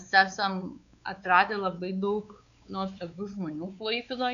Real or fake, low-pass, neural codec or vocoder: fake; 7.2 kHz; codec, 16 kHz, 4 kbps, X-Codec, WavLM features, trained on Multilingual LibriSpeech